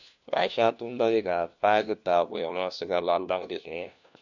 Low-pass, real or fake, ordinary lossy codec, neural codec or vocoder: 7.2 kHz; fake; none; codec, 16 kHz, 1 kbps, FunCodec, trained on LibriTTS, 50 frames a second